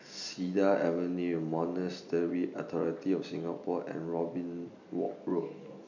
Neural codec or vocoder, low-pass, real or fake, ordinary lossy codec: none; 7.2 kHz; real; none